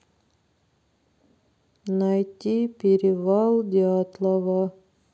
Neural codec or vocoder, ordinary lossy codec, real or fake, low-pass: none; none; real; none